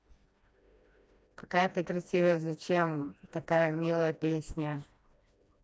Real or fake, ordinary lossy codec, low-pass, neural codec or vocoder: fake; none; none; codec, 16 kHz, 1 kbps, FreqCodec, smaller model